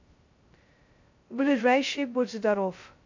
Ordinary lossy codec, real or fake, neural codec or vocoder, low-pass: MP3, 48 kbps; fake; codec, 16 kHz, 0.2 kbps, FocalCodec; 7.2 kHz